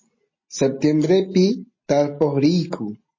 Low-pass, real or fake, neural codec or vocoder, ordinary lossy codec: 7.2 kHz; real; none; MP3, 32 kbps